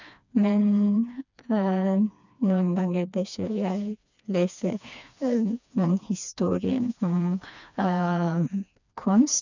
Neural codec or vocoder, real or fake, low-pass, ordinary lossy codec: codec, 16 kHz, 2 kbps, FreqCodec, smaller model; fake; 7.2 kHz; none